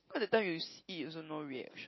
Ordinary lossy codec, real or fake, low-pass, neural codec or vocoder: MP3, 24 kbps; real; 7.2 kHz; none